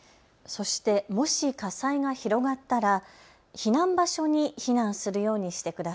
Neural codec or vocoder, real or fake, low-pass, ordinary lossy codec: none; real; none; none